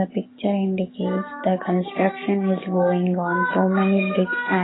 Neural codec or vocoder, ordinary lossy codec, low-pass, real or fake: codec, 44.1 kHz, 7.8 kbps, DAC; AAC, 16 kbps; 7.2 kHz; fake